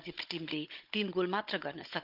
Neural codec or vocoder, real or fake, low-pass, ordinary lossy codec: codec, 16 kHz, 16 kbps, FunCodec, trained on Chinese and English, 50 frames a second; fake; 5.4 kHz; Opus, 24 kbps